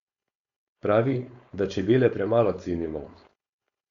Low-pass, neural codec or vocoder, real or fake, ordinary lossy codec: 7.2 kHz; codec, 16 kHz, 4.8 kbps, FACodec; fake; Opus, 64 kbps